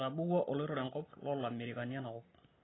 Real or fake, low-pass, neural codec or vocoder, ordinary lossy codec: real; 7.2 kHz; none; AAC, 16 kbps